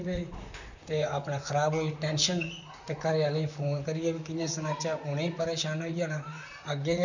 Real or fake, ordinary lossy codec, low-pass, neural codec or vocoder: fake; none; 7.2 kHz; vocoder, 44.1 kHz, 128 mel bands, Pupu-Vocoder